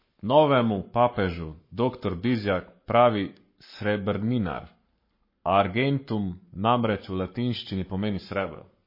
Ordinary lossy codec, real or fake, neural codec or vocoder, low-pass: MP3, 24 kbps; fake; codec, 44.1 kHz, 7.8 kbps, Pupu-Codec; 5.4 kHz